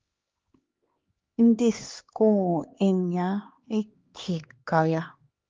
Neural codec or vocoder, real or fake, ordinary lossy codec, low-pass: codec, 16 kHz, 2 kbps, X-Codec, HuBERT features, trained on LibriSpeech; fake; Opus, 24 kbps; 7.2 kHz